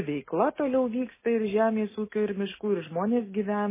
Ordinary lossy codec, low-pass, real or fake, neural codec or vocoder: MP3, 16 kbps; 3.6 kHz; real; none